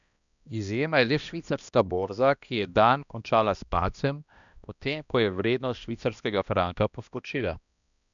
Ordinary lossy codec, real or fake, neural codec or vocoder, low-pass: none; fake; codec, 16 kHz, 1 kbps, X-Codec, HuBERT features, trained on balanced general audio; 7.2 kHz